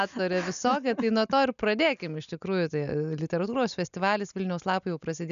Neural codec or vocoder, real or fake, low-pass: none; real; 7.2 kHz